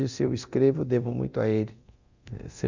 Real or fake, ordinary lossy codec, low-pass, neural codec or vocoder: fake; Opus, 64 kbps; 7.2 kHz; codec, 24 kHz, 0.9 kbps, DualCodec